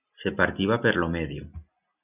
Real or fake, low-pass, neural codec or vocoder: real; 3.6 kHz; none